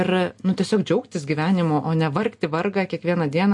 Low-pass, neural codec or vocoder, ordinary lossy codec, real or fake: 10.8 kHz; none; MP3, 48 kbps; real